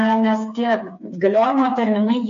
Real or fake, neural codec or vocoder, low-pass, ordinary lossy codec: fake; codec, 16 kHz, 4 kbps, FreqCodec, smaller model; 7.2 kHz; MP3, 48 kbps